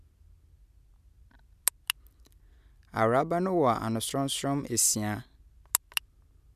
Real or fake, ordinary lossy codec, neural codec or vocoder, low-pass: real; none; none; 14.4 kHz